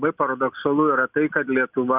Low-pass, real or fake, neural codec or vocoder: 3.6 kHz; real; none